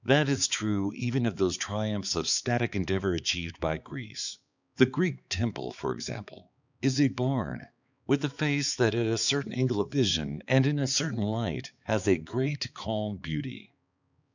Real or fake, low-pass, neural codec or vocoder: fake; 7.2 kHz; codec, 16 kHz, 4 kbps, X-Codec, HuBERT features, trained on balanced general audio